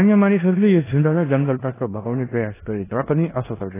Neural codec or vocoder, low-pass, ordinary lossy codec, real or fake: codec, 16 kHz in and 24 kHz out, 0.9 kbps, LongCat-Audio-Codec, four codebook decoder; 3.6 kHz; AAC, 16 kbps; fake